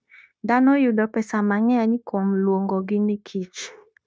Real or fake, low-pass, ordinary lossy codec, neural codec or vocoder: fake; none; none; codec, 16 kHz, 0.9 kbps, LongCat-Audio-Codec